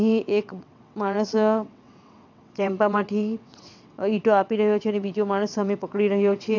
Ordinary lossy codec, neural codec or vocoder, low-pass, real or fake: none; vocoder, 22.05 kHz, 80 mel bands, WaveNeXt; 7.2 kHz; fake